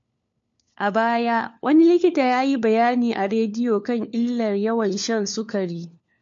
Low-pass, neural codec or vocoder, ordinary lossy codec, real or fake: 7.2 kHz; codec, 16 kHz, 4 kbps, FunCodec, trained on LibriTTS, 50 frames a second; MP3, 48 kbps; fake